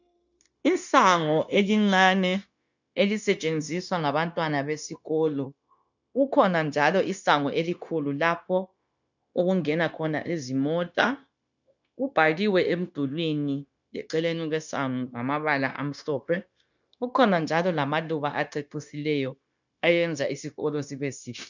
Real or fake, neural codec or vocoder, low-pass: fake; codec, 16 kHz, 0.9 kbps, LongCat-Audio-Codec; 7.2 kHz